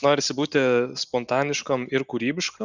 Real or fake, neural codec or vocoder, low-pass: real; none; 7.2 kHz